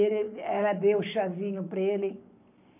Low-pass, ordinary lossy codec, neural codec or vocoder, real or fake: 3.6 kHz; none; codec, 44.1 kHz, 7.8 kbps, Pupu-Codec; fake